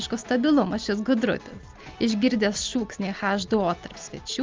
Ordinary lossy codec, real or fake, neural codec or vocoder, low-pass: Opus, 32 kbps; real; none; 7.2 kHz